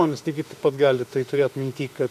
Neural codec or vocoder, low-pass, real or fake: autoencoder, 48 kHz, 32 numbers a frame, DAC-VAE, trained on Japanese speech; 14.4 kHz; fake